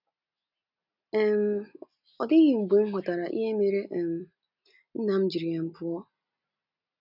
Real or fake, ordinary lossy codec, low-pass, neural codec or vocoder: real; none; 5.4 kHz; none